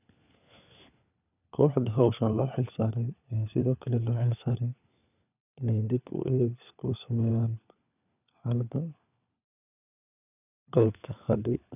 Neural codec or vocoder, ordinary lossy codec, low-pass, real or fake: codec, 16 kHz, 4 kbps, FunCodec, trained on LibriTTS, 50 frames a second; none; 3.6 kHz; fake